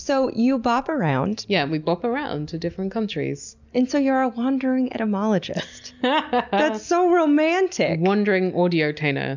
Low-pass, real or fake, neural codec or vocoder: 7.2 kHz; real; none